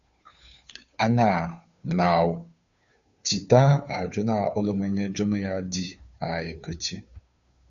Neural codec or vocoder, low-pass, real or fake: codec, 16 kHz, 2 kbps, FunCodec, trained on Chinese and English, 25 frames a second; 7.2 kHz; fake